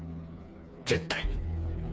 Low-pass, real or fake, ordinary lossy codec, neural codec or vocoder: none; fake; none; codec, 16 kHz, 4 kbps, FreqCodec, smaller model